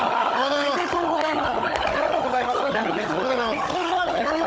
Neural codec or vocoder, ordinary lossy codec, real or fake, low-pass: codec, 16 kHz, 16 kbps, FunCodec, trained on Chinese and English, 50 frames a second; none; fake; none